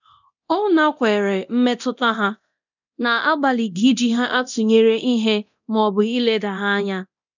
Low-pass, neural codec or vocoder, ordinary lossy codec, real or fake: 7.2 kHz; codec, 24 kHz, 0.9 kbps, DualCodec; none; fake